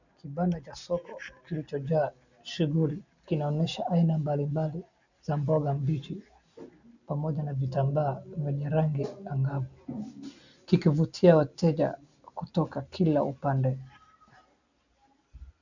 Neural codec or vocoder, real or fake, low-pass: none; real; 7.2 kHz